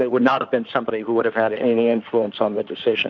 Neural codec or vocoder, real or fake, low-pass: codec, 16 kHz in and 24 kHz out, 2.2 kbps, FireRedTTS-2 codec; fake; 7.2 kHz